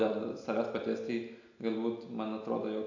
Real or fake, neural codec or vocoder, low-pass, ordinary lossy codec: real; none; 7.2 kHz; AAC, 48 kbps